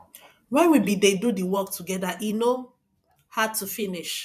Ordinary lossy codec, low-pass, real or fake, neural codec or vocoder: none; 14.4 kHz; fake; vocoder, 44.1 kHz, 128 mel bands every 512 samples, BigVGAN v2